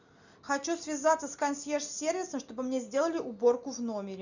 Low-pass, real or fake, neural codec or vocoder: 7.2 kHz; real; none